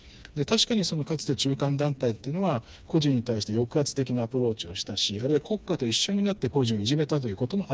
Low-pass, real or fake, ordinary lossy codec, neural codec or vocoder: none; fake; none; codec, 16 kHz, 2 kbps, FreqCodec, smaller model